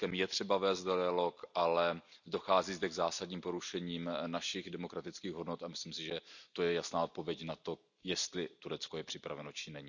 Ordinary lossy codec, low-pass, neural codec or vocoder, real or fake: none; 7.2 kHz; none; real